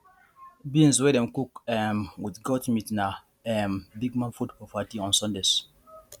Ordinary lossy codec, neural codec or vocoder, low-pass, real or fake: none; none; 19.8 kHz; real